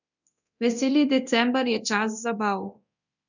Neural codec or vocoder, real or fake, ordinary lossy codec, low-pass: codec, 24 kHz, 0.9 kbps, DualCodec; fake; none; 7.2 kHz